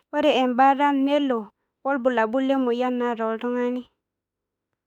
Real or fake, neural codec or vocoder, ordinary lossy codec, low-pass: fake; autoencoder, 48 kHz, 32 numbers a frame, DAC-VAE, trained on Japanese speech; none; 19.8 kHz